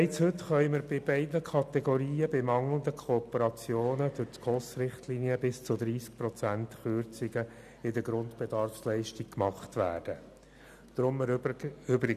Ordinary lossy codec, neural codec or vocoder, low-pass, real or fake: none; none; 14.4 kHz; real